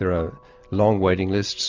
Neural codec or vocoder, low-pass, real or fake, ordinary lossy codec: none; 7.2 kHz; real; Opus, 32 kbps